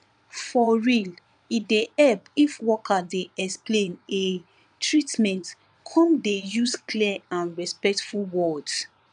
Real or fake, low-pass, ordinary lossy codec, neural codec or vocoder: fake; 9.9 kHz; none; vocoder, 22.05 kHz, 80 mel bands, Vocos